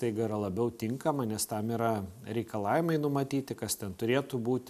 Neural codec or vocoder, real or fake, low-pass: none; real; 14.4 kHz